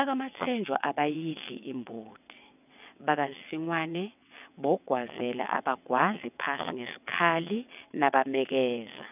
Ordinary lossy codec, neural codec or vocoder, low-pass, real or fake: none; vocoder, 22.05 kHz, 80 mel bands, WaveNeXt; 3.6 kHz; fake